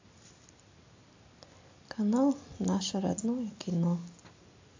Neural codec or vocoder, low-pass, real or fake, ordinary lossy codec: none; 7.2 kHz; real; AAC, 48 kbps